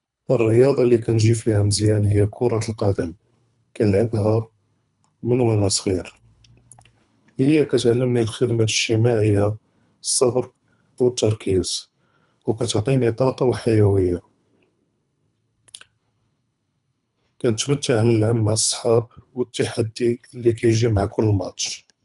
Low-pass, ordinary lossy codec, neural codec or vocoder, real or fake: 10.8 kHz; none; codec, 24 kHz, 3 kbps, HILCodec; fake